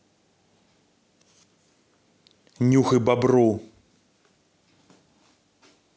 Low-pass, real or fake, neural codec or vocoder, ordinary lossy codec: none; real; none; none